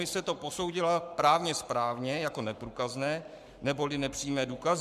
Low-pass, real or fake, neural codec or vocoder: 14.4 kHz; fake; codec, 44.1 kHz, 7.8 kbps, Pupu-Codec